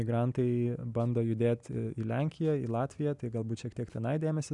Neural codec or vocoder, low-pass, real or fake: none; 10.8 kHz; real